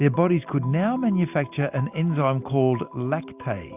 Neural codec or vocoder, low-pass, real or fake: none; 3.6 kHz; real